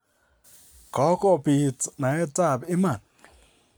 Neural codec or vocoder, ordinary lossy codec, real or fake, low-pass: none; none; real; none